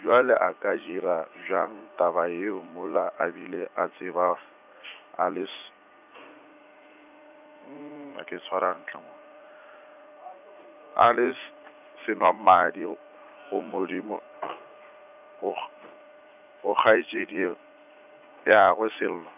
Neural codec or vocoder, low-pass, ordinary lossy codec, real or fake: vocoder, 44.1 kHz, 80 mel bands, Vocos; 3.6 kHz; none; fake